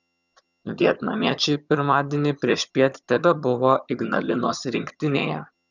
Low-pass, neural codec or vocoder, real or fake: 7.2 kHz; vocoder, 22.05 kHz, 80 mel bands, HiFi-GAN; fake